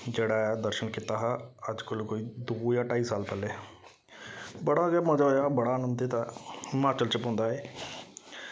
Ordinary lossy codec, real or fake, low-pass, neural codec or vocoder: none; real; none; none